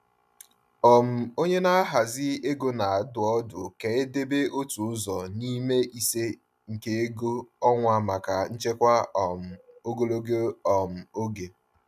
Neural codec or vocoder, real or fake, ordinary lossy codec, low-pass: none; real; none; 14.4 kHz